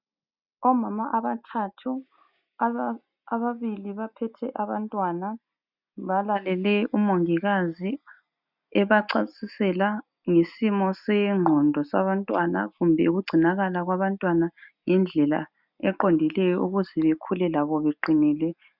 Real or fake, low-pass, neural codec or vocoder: real; 5.4 kHz; none